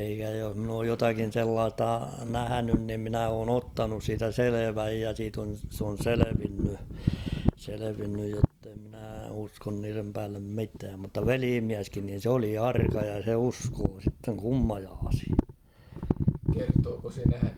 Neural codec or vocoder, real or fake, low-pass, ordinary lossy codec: none; real; 19.8 kHz; Opus, 32 kbps